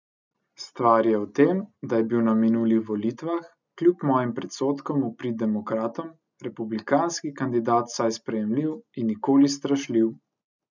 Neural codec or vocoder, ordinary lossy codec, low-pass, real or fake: none; none; 7.2 kHz; real